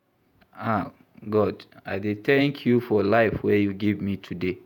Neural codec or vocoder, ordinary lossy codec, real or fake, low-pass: vocoder, 48 kHz, 128 mel bands, Vocos; none; fake; 19.8 kHz